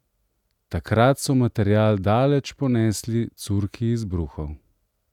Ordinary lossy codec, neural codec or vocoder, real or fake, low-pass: none; none; real; 19.8 kHz